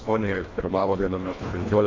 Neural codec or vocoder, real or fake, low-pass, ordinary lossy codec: codec, 24 kHz, 1.5 kbps, HILCodec; fake; 7.2 kHz; AAC, 32 kbps